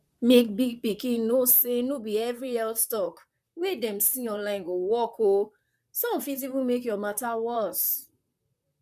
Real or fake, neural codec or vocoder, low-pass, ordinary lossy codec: fake; vocoder, 44.1 kHz, 128 mel bands, Pupu-Vocoder; 14.4 kHz; none